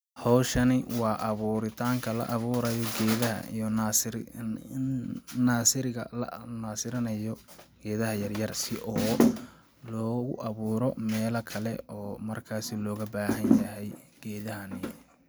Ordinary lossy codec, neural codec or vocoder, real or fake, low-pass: none; none; real; none